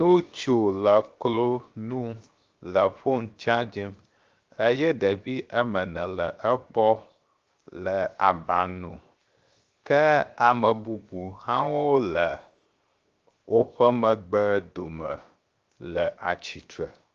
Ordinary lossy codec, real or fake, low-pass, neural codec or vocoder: Opus, 32 kbps; fake; 7.2 kHz; codec, 16 kHz, 0.7 kbps, FocalCodec